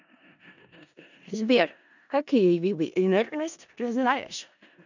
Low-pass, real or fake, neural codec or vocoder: 7.2 kHz; fake; codec, 16 kHz in and 24 kHz out, 0.4 kbps, LongCat-Audio-Codec, four codebook decoder